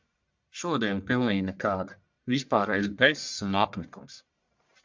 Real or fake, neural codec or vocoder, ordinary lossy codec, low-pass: fake; codec, 44.1 kHz, 1.7 kbps, Pupu-Codec; MP3, 64 kbps; 7.2 kHz